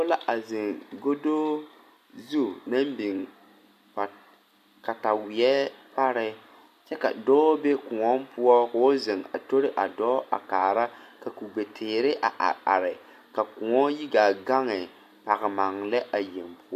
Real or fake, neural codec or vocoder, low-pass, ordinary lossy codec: real; none; 14.4 kHz; MP3, 64 kbps